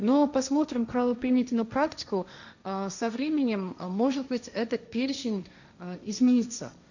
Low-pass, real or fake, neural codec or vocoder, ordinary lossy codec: 7.2 kHz; fake; codec, 16 kHz, 1.1 kbps, Voila-Tokenizer; none